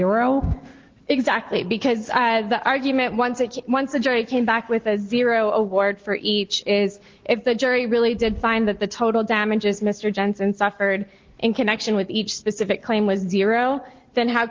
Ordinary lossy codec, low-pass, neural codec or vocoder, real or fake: Opus, 16 kbps; 7.2 kHz; none; real